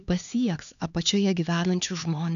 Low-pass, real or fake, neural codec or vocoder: 7.2 kHz; fake; codec, 16 kHz, 4 kbps, X-Codec, HuBERT features, trained on LibriSpeech